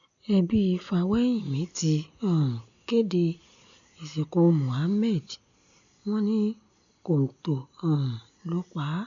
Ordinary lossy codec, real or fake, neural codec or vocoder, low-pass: none; real; none; 7.2 kHz